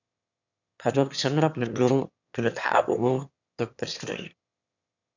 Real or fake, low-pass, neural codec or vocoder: fake; 7.2 kHz; autoencoder, 22.05 kHz, a latent of 192 numbers a frame, VITS, trained on one speaker